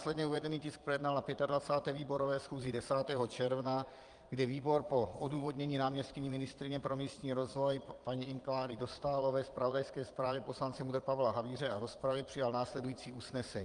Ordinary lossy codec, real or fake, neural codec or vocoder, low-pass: Opus, 32 kbps; fake; vocoder, 22.05 kHz, 80 mel bands, Vocos; 9.9 kHz